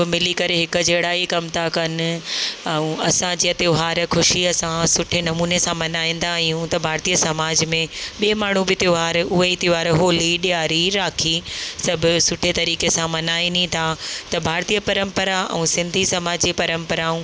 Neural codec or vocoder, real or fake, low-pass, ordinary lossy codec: none; real; none; none